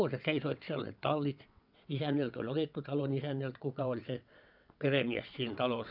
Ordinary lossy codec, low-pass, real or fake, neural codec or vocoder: none; 5.4 kHz; fake; vocoder, 22.05 kHz, 80 mel bands, WaveNeXt